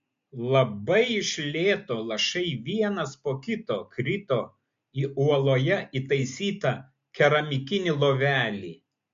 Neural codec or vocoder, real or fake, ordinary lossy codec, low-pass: none; real; MP3, 48 kbps; 7.2 kHz